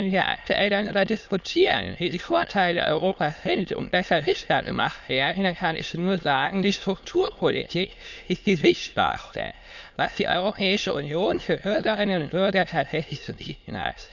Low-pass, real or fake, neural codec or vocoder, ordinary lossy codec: 7.2 kHz; fake; autoencoder, 22.05 kHz, a latent of 192 numbers a frame, VITS, trained on many speakers; none